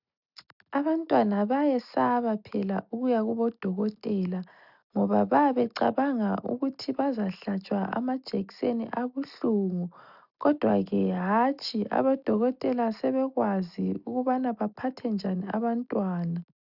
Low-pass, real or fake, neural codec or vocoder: 5.4 kHz; real; none